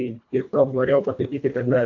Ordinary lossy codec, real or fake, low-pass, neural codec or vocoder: AAC, 48 kbps; fake; 7.2 kHz; codec, 24 kHz, 1.5 kbps, HILCodec